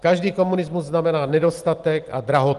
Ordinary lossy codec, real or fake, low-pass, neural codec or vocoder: Opus, 24 kbps; real; 10.8 kHz; none